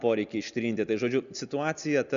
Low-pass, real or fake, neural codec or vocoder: 7.2 kHz; real; none